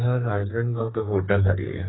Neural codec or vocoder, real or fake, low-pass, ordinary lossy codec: codec, 44.1 kHz, 2.6 kbps, SNAC; fake; 7.2 kHz; AAC, 16 kbps